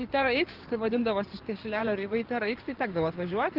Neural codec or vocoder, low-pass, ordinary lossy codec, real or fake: codec, 16 kHz in and 24 kHz out, 2.2 kbps, FireRedTTS-2 codec; 5.4 kHz; Opus, 16 kbps; fake